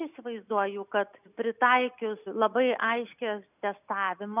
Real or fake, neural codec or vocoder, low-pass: real; none; 3.6 kHz